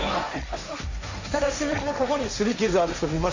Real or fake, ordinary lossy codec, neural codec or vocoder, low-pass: fake; Opus, 64 kbps; codec, 16 kHz, 1.1 kbps, Voila-Tokenizer; 7.2 kHz